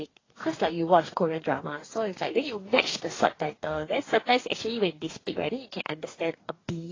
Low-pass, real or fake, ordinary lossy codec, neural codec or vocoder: 7.2 kHz; fake; AAC, 32 kbps; codec, 44.1 kHz, 2.6 kbps, DAC